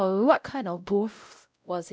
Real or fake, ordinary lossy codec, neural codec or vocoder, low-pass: fake; none; codec, 16 kHz, 0.5 kbps, X-Codec, WavLM features, trained on Multilingual LibriSpeech; none